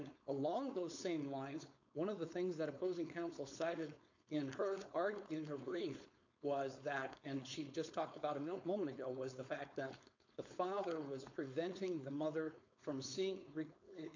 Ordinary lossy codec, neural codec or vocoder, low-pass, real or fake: MP3, 64 kbps; codec, 16 kHz, 4.8 kbps, FACodec; 7.2 kHz; fake